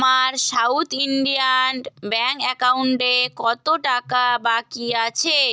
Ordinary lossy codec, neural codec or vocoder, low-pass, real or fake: none; none; none; real